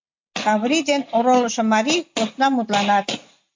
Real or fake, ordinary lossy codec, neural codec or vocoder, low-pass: real; MP3, 48 kbps; none; 7.2 kHz